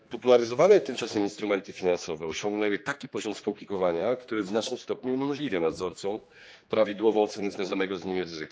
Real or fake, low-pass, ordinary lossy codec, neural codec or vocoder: fake; none; none; codec, 16 kHz, 2 kbps, X-Codec, HuBERT features, trained on general audio